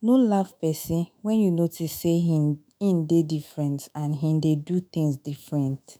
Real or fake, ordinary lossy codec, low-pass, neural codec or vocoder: fake; none; none; autoencoder, 48 kHz, 128 numbers a frame, DAC-VAE, trained on Japanese speech